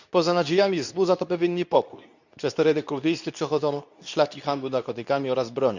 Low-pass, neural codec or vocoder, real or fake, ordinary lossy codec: 7.2 kHz; codec, 24 kHz, 0.9 kbps, WavTokenizer, medium speech release version 2; fake; none